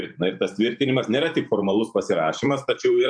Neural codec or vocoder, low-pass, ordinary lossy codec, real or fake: vocoder, 44.1 kHz, 128 mel bands every 512 samples, BigVGAN v2; 9.9 kHz; MP3, 64 kbps; fake